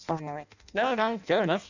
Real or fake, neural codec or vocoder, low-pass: fake; codec, 16 kHz in and 24 kHz out, 0.6 kbps, FireRedTTS-2 codec; 7.2 kHz